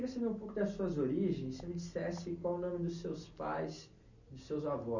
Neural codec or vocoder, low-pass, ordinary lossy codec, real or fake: none; 7.2 kHz; none; real